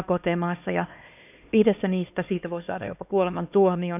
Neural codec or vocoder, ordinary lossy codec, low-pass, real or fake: codec, 16 kHz, 2 kbps, X-Codec, HuBERT features, trained on LibriSpeech; none; 3.6 kHz; fake